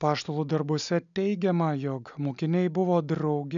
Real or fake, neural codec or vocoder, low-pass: real; none; 7.2 kHz